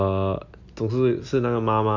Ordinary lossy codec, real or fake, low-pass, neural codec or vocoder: Opus, 64 kbps; real; 7.2 kHz; none